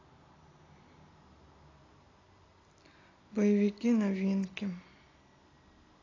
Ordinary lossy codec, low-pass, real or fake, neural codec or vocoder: AAC, 32 kbps; 7.2 kHz; real; none